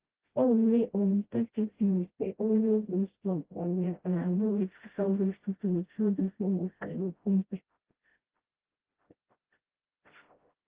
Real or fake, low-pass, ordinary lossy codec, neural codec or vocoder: fake; 3.6 kHz; Opus, 16 kbps; codec, 16 kHz, 0.5 kbps, FreqCodec, smaller model